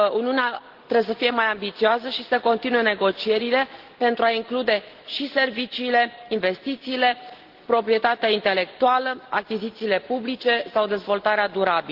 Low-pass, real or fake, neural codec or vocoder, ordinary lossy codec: 5.4 kHz; real; none; Opus, 16 kbps